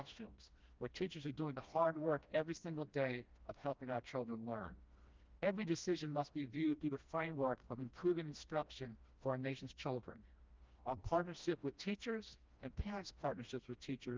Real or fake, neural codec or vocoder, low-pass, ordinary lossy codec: fake; codec, 16 kHz, 1 kbps, FreqCodec, smaller model; 7.2 kHz; Opus, 24 kbps